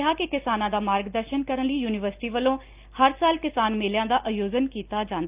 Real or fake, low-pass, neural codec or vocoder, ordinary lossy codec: real; 3.6 kHz; none; Opus, 24 kbps